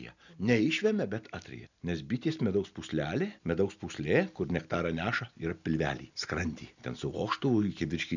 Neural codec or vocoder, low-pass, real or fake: none; 7.2 kHz; real